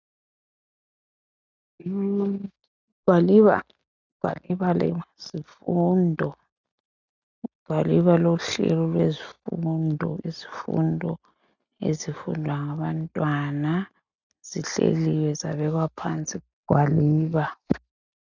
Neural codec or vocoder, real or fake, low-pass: none; real; 7.2 kHz